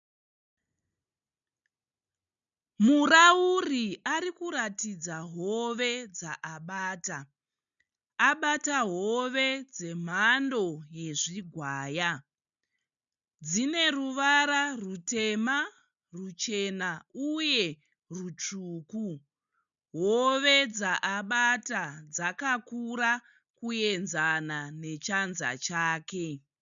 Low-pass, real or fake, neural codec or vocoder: 7.2 kHz; real; none